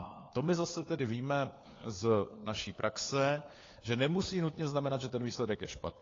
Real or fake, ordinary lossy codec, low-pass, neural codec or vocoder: fake; AAC, 32 kbps; 7.2 kHz; codec, 16 kHz, 4 kbps, FunCodec, trained on LibriTTS, 50 frames a second